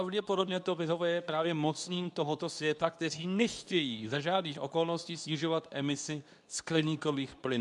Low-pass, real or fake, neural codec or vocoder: 10.8 kHz; fake; codec, 24 kHz, 0.9 kbps, WavTokenizer, medium speech release version 2